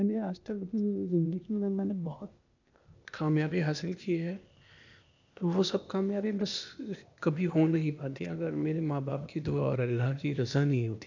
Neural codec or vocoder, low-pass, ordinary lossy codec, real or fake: codec, 16 kHz, 0.8 kbps, ZipCodec; 7.2 kHz; none; fake